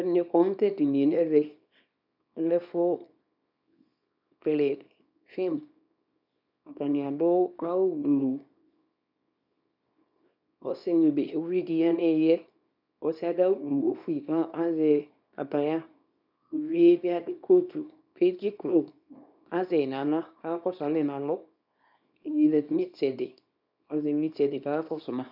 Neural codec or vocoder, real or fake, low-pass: codec, 24 kHz, 0.9 kbps, WavTokenizer, small release; fake; 5.4 kHz